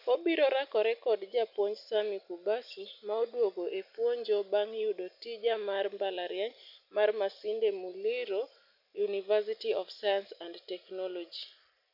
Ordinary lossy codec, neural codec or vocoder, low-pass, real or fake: none; none; 5.4 kHz; real